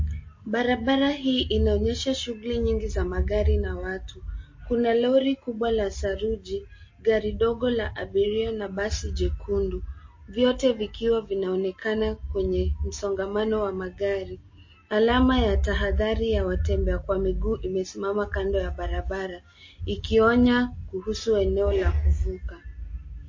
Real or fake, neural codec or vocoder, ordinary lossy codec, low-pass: real; none; MP3, 32 kbps; 7.2 kHz